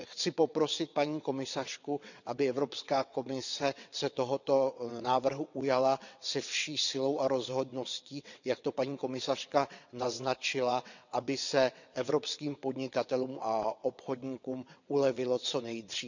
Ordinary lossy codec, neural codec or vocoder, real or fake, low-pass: none; vocoder, 22.05 kHz, 80 mel bands, WaveNeXt; fake; 7.2 kHz